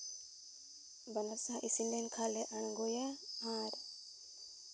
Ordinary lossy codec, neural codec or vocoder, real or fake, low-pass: none; none; real; none